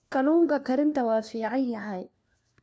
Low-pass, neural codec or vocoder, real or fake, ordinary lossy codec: none; codec, 16 kHz, 1 kbps, FunCodec, trained on LibriTTS, 50 frames a second; fake; none